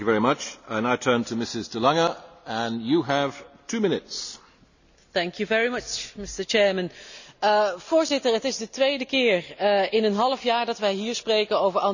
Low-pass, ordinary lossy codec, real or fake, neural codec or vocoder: 7.2 kHz; none; real; none